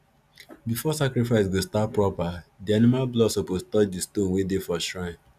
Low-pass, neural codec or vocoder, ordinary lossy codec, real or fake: 14.4 kHz; none; none; real